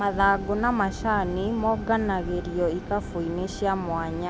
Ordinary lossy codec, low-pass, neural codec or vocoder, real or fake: none; none; none; real